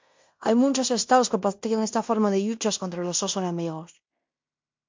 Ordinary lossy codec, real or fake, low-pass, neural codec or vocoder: MP3, 48 kbps; fake; 7.2 kHz; codec, 16 kHz in and 24 kHz out, 0.9 kbps, LongCat-Audio-Codec, fine tuned four codebook decoder